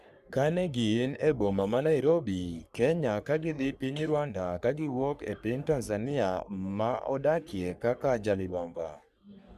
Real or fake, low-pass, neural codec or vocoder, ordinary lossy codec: fake; 14.4 kHz; codec, 44.1 kHz, 3.4 kbps, Pupu-Codec; none